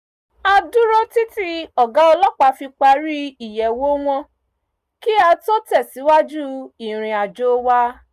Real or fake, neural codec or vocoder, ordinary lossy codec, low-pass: real; none; Opus, 64 kbps; 14.4 kHz